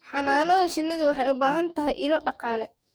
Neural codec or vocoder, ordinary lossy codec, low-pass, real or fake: codec, 44.1 kHz, 2.6 kbps, DAC; none; none; fake